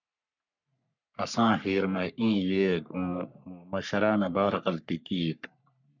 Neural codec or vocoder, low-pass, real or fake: codec, 44.1 kHz, 3.4 kbps, Pupu-Codec; 7.2 kHz; fake